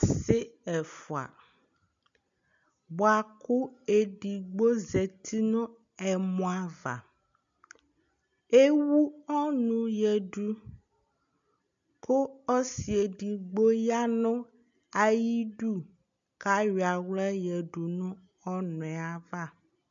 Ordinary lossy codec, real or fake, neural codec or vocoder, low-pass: MP3, 64 kbps; real; none; 7.2 kHz